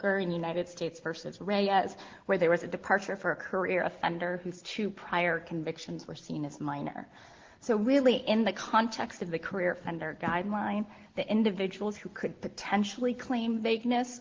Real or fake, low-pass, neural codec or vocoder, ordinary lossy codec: real; 7.2 kHz; none; Opus, 16 kbps